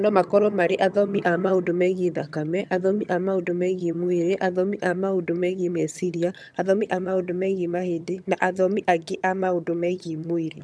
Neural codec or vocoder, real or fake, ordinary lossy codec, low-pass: vocoder, 22.05 kHz, 80 mel bands, HiFi-GAN; fake; none; none